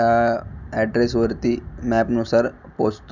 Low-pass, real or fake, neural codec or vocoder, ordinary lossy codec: 7.2 kHz; real; none; none